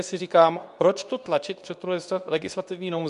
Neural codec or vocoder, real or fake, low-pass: codec, 24 kHz, 0.9 kbps, WavTokenizer, medium speech release version 2; fake; 10.8 kHz